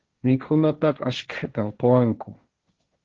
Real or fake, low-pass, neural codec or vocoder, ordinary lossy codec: fake; 7.2 kHz; codec, 16 kHz, 1.1 kbps, Voila-Tokenizer; Opus, 16 kbps